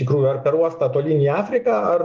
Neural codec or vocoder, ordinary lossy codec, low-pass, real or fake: none; Opus, 24 kbps; 7.2 kHz; real